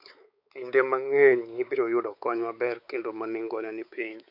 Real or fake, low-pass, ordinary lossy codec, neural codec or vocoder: fake; 5.4 kHz; none; codec, 16 kHz, 4 kbps, X-Codec, WavLM features, trained on Multilingual LibriSpeech